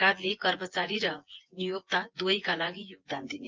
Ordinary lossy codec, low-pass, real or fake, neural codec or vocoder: Opus, 32 kbps; 7.2 kHz; fake; vocoder, 24 kHz, 100 mel bands, Vocos